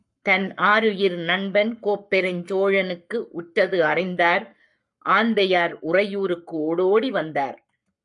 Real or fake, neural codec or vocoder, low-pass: fake; codec, 44.1 kHz, 7.8 kbps, Pupu-Codec; 10.8 kHz